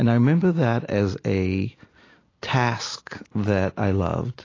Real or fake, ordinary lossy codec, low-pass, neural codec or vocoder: real; AAC, 32 kbps; 7.2 kHz; none